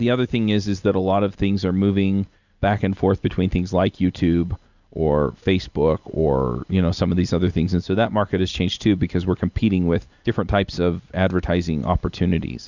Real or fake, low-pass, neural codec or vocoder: real; 7.2 kHz; none